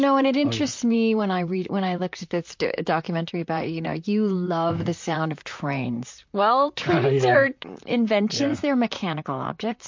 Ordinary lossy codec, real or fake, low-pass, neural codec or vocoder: MP3, 48 kbps; fake; 7.2 kHz; vocoder, 44.1 kHz, 128 mel bands, Pupu-Vocoder